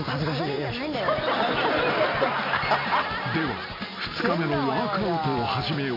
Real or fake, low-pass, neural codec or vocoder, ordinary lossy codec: real; 5.4 kHz; none; MP3, 48 kbps